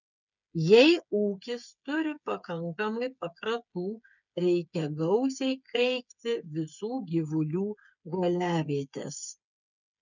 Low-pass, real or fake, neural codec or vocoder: 7.2 kHz; fake; codec, 16 kHz, 8 kbps, FreqCodec, smaller model